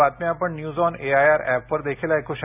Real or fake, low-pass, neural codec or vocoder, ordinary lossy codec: real; 3.6 kHz; none; none